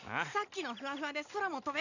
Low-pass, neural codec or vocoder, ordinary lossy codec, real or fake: 7.2 kHz; none; none; real